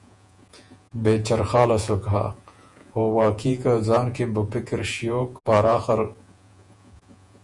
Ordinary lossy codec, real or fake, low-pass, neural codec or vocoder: Opus, 64 kbps; fake; 10.8 kHz; vocoder, 48 kHz, 128 mel bands, Vocos